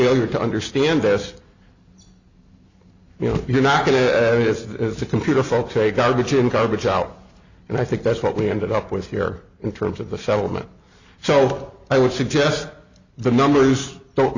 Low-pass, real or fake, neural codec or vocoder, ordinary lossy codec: 7.2 kHz; real; none; Opus, 64 kbps